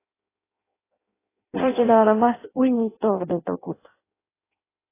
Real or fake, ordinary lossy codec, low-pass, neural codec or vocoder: fake; AAC, 16 kbps; 3.6 kHz; codec, 16 kHz in and 24 kHz out, 0.6 kbps, FireRedTTS-2 codec